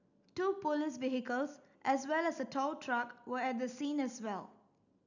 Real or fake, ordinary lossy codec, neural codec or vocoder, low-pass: real; none; none; 7.2 kHz